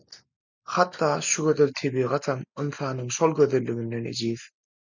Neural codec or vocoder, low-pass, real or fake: none; 7.2 kHz; real